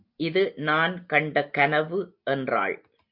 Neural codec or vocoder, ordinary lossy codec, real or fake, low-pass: none; MP3, 48 kbps; real; 5.4 kHz